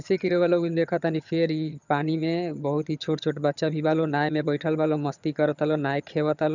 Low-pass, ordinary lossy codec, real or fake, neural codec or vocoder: 7.2 kHz; none; fake; vocoder, 22.05 kHz, 80 mel bands, HiFi-GAN